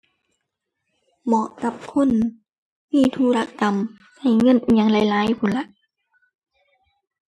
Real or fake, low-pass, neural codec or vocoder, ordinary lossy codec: real; none; none; none